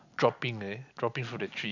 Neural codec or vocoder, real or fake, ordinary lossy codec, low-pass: none; real; none; 7.2 kHz